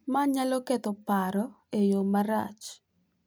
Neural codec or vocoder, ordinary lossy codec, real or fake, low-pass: none; none; real; none